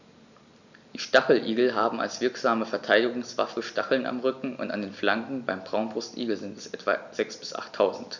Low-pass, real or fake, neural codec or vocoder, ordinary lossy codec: 7.2 kHz; real; none; none